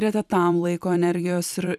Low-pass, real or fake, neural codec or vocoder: 14.4 kHz; fake; vocoder, 44.1 kHz, 128 mel bands every 256 samples, BigVGAN v2